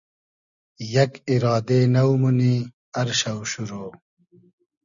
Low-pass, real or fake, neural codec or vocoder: 7.2 kHz; real; none